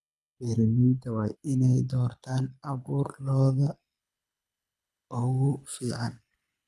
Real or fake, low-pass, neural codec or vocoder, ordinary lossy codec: fake; none; codec, 24 kHz, 6 kbps, HILCodec; none